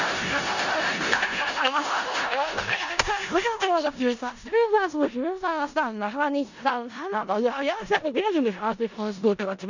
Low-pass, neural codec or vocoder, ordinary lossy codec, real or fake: 7.2 kHz; codec, 16 kHz in and 24 kHz out, 0.4 kbps, LongCat-Audio-Codec, four codebook decoder; none; fake